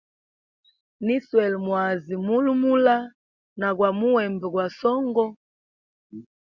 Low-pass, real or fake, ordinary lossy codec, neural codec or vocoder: 7.2 kHz; real; Opus, 64 kbps; none